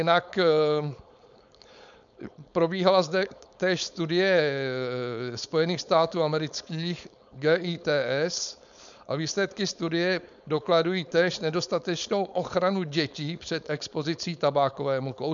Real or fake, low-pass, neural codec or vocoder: fake; 7.2 kHz; codec, 16 kHz, 4.8 kbps, FACodec